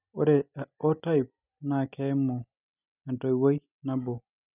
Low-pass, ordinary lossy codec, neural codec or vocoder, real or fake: 3.6 kHz; none; none; real